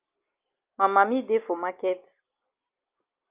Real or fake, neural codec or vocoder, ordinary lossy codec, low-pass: real; none; Opus, 24 kbps; 3.6 kHz